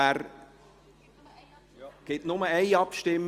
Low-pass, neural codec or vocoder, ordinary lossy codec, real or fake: 14.4 kHz; none; Opus, 24 kbps; real